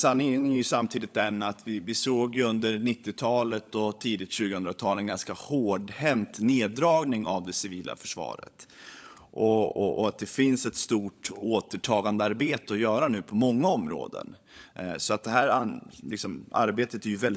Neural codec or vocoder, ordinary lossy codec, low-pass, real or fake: codec, 16 kHz, 16 kbps, FunCodec, trained on LibriTTS, 50 frames a second; none; none; fake